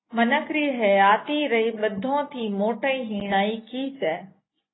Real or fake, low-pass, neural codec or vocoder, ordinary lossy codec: real; 7.2 kHz; none; AAC, 16 kbps